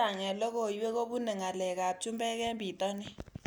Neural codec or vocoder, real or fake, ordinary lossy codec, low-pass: none; real; none; none